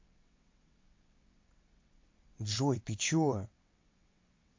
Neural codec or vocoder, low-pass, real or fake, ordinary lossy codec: vocoder, 22.05 kHz, 80 mel bands, WaveNeXt; 7.2 kHz; fake; MP3, 48 kbps